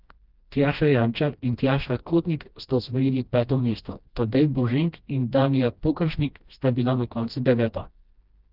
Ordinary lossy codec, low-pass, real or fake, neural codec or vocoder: Opus, 24 kbps; 5.4 kHz; fake; codec, 16 kHz, 1 kbps, FreqCodec, smaller model